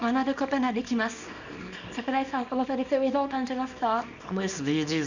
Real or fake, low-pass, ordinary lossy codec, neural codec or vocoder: fake; 7.2 kHz; none; codec, 24 kHz, 0.9 kbps, WavTokenizer, small release